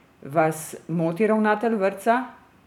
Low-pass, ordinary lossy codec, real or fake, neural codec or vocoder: 19.8 kHz; none; fake; vocoder, 44.1 kHz, 128 mel bands every 512 samples, BigVGAN v2